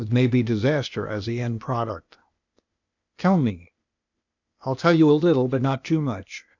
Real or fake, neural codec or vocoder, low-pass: fake; codec, 16 kHz, 0.8 kbps, ZipCodec; 7.2 kHz